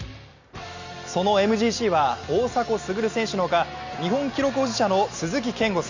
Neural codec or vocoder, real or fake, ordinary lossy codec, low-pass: none; real; Opus, 64 kbps; 7.2 kHz